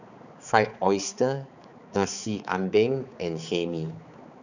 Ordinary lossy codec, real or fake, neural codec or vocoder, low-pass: none; fake; codec, 16 kHz, 4 kbps, X-Codec, HuBERT features, trained on balanced general audio; 7.2 kHz